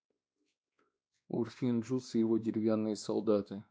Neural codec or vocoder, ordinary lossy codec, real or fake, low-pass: codec, 16 kHz, 2 kbps, X-Codec, WavLM features, trained on Multilingual LibriSpeech; none; fake; none